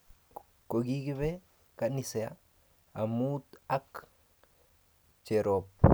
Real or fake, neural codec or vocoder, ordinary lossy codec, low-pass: real; none; none; none